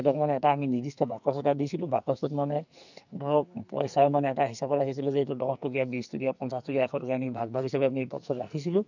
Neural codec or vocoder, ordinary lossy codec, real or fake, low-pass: codec, 44.1 kHz, 2.6 kbps, SNAC; none; fake; 7.2 kHz